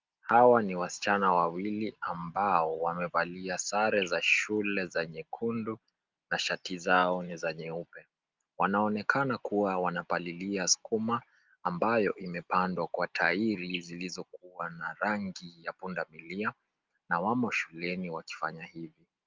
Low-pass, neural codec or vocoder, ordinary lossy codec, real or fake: 7.2 kHz; none; Opus, 24 kbps; real